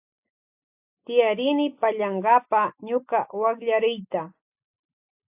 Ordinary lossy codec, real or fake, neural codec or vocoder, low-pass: AAC, 32 kbps; real; none; 3.6 kHz